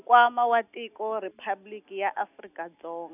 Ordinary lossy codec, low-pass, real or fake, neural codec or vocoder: none; 3.6 kHz; real; none